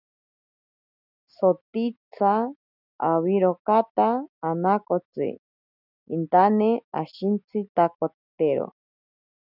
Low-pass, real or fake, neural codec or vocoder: 5.4 kHz; real; none